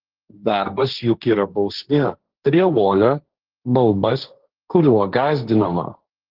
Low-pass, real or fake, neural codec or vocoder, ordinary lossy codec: 5.4 kHz; fake; codec, 16 kHz, 1.1 kbps, Voila-Tokenizer; Opus, 16 kbps